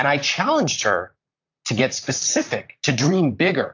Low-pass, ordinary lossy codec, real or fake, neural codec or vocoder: 7.2 kHz; AAC, 48 kbps; fake; vocoder, 44.1 kHz, 128 mel bands, Pupu-Vocoder